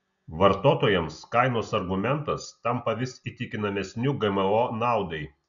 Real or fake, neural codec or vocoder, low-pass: real; none; 7.2 kHz